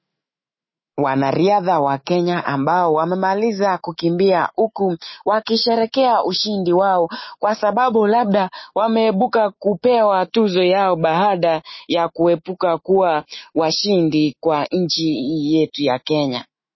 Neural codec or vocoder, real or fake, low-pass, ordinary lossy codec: autoencoder, 48 kHz, 128 numbers a frame, DAC-VAE, trained on Japanese speech; fake; 7.2 kHz; MP3, 24 kbps